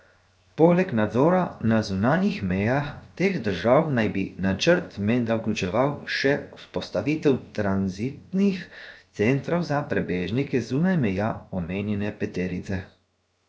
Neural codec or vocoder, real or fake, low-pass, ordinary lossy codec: codec, 16 kHz, 0.7 kbps, FocalCodec; fake; none; none